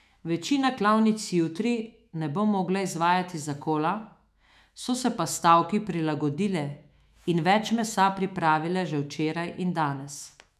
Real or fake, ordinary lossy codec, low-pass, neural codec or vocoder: fake; none; 14.4 kHz; autoencoder, 48 kHz, 128 numbers a frame, DAC-VAE, trained on Japanese speech